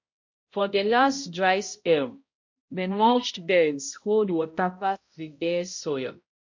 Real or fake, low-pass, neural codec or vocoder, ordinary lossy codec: fake; 7.2 kHz; codec, 16 kHz, 0.5 kbps, X-Codec, HuBERT features, trained on balanced general audio; MP3, 48 kbps